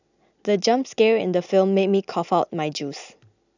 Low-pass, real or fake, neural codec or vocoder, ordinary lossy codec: 7.2 kHz; real; none; none